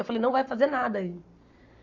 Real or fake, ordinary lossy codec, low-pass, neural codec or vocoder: fake; none; 7.2 kHz; codec, 44.1 kHz, 7.8 kbps, Pupu-Codec